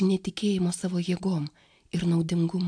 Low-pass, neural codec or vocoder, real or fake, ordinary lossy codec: 9.9 kHz; none; real; AAC, 64 kbps